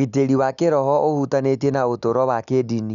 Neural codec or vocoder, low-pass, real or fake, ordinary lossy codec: none; 7.2 kHz; real; none